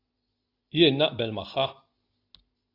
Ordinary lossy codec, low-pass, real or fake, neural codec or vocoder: AAC, 32 kbps; 5.4 kHz; real; none